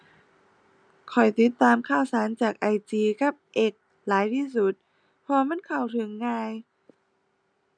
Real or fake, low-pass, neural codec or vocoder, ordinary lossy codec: real; 9.9 kHz; none; none